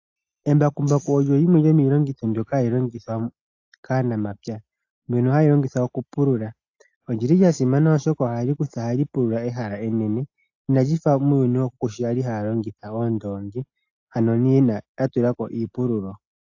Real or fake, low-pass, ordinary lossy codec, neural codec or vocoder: real; 7.2 kHz; AAC, 48 kbps; none